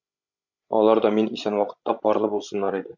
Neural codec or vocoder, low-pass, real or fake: codec, 16 kHz, 16 kbps, FreqCodec, larger model; 7.2 kHz; fake